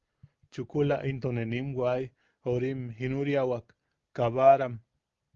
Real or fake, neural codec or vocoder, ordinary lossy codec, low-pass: real; none; Opus, 32 kbps; 7.2 kHz